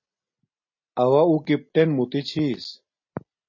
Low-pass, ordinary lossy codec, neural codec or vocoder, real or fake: 7.2 kHz; MP3, 32 kbps; none; real